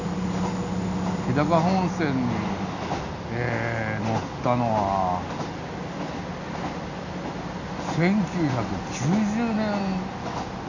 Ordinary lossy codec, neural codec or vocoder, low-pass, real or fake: none; none; 7.2 kHz; real